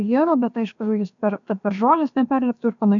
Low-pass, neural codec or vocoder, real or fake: 7.2 kHz; codec, 16 kHz, about 1 kbps, DyCAST, with the encoder's durations; fake